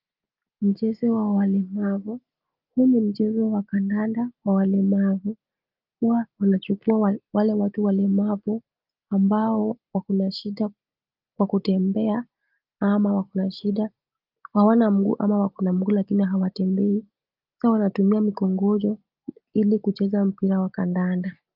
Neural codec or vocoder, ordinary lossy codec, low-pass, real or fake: none; Opus, 32 kbps; 5.4 kHz; real